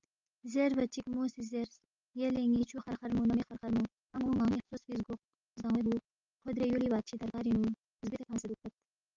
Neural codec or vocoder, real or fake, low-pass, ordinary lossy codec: none; real; 7.2 kHz; Opus, 32 kbps